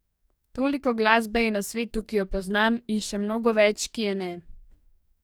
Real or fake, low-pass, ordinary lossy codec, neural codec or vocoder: fake; none; none; codec, 44.1 kHz, 2.6 kbps, DAC